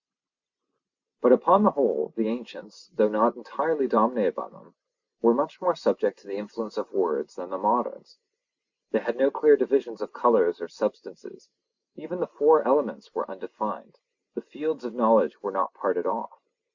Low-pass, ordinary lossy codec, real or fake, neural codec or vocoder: 7.2 kHz; Opus, 64 kbps; real; none